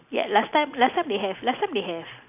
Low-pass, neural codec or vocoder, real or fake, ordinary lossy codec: 3.6 kHz; none; real; none